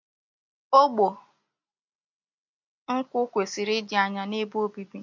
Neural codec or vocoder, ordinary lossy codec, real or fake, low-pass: none; MP3, 48 kbps; real; 7.2 kHz